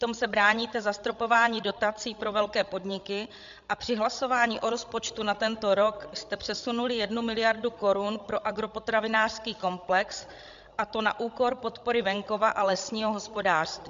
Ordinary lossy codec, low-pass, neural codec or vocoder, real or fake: MP3, 64 kbps; 7.2 kHz; codec, 16 kHz, 16 kbps, FreqCodec, larger model; fake